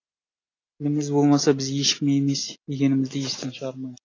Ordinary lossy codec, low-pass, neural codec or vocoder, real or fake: AAC, 32 kbps; 7.2 kHz; none; real